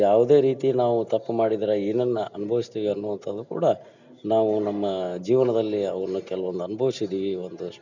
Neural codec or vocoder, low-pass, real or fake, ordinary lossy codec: none; 7.2 kHz; real; none